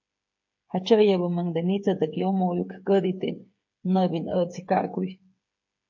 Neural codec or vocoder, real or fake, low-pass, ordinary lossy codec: codec, 16 kHz, 8 kbps, FreqCodec, smaller model; fake; 7.2 kHz; MP3, 48 kbps